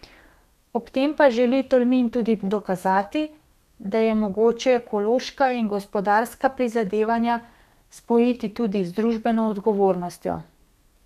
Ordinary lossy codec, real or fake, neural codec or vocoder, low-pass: none; fake; codec, 32 kHz, 1.9 kbps, SNAC; 14.4 kHz